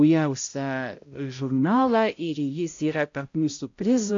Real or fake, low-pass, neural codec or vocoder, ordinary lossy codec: fake; 7.2 kHz; codec, 16 kHz, 0.5 kbps, X-Codec, HuBERT features, trained on balanced general audio; AAC, 48 kbps